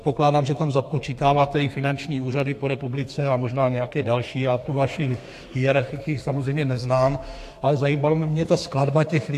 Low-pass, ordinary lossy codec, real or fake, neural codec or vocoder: 14.4 kHz; AAC, 64 kbps; fake; codec, 32 kHz, 1.9 kbps, SNAC